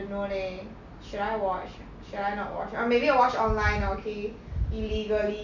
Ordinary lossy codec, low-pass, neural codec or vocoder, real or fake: none; 7.2 kHz; none; real